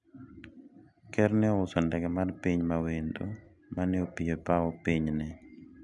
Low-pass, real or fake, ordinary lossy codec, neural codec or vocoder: 10.8 kHz; real; none; none